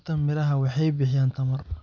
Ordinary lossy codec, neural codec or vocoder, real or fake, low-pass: none; none; real; 7.2 kHz